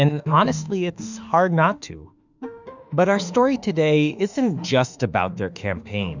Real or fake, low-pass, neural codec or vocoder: fake; 7.2 kHz; autoencoder, 48 kHz, 32 numbers a frame, DAC-VAE, trained on Japanese speech